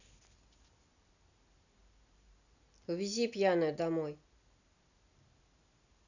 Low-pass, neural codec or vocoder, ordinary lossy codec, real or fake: 7.2 kHz; none; none; real